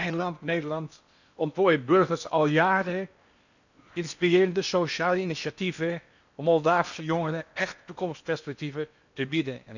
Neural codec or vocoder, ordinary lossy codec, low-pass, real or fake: codec, 16 kHz in and 24 kHz out, 0.8 kbps, FocalCodec, streaming, 65536 codes; none; 7.2 kHz; fake